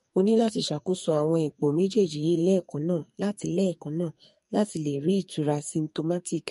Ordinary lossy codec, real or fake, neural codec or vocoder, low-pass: MP3, 48 kbps; fake; codec, 44.1 kHz, 2.6 kbps, SNAC; 14.4 kHz